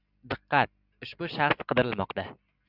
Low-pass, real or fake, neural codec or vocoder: 5.4 kHz; real; none